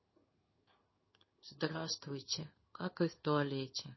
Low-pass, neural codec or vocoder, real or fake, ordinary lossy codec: 7.2 kHz; codec, 16 kHz in and 24 kHz out, 1 kbps, XY-Tokenizer; fake; MP3, 24 kbps